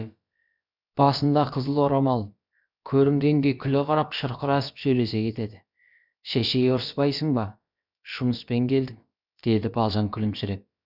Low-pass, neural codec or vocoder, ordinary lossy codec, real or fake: 5.4 kHz; codec, 16 kHz, about 1 kbps, DyCAST, with the encoder's durations; none; fake